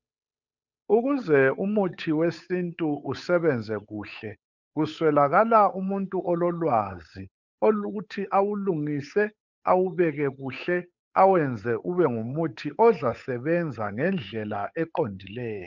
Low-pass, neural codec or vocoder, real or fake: 7.2 kHz; codec, 16 kHz, 8 kbps, FunCodec, trained on Chinese and English, 25 frames a second; fake